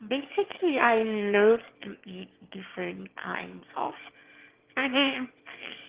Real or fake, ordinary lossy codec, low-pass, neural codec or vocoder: fake; Opus, 16 kbps; 3.6 kHz; autoencoder, 22.05 kHz, a latent of 192 numbers a frame, VITS, trained on one speaker